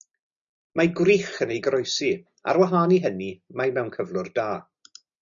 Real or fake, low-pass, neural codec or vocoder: real; 7.2 kHz; none